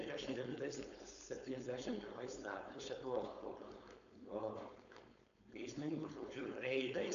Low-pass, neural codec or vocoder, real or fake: 7.2 kHz; codec, 16 kHz, 4.8 kbps, FACodec; fake